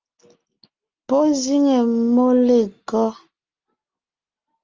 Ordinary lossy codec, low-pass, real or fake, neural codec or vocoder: Opus, 24 kbps; 7.2 kHz; real; none